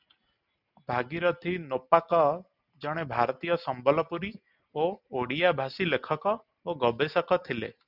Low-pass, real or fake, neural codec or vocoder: 5.4 kHz; real; none